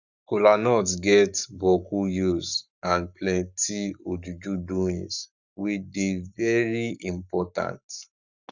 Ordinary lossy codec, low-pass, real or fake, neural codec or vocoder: none; 7.2 kHz; fake; codec, 44.1 kHz, 7.8 kbps, Pupu-Codec